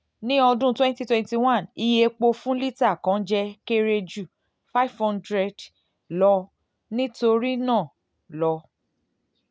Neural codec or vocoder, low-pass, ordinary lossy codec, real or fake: none; none; none; real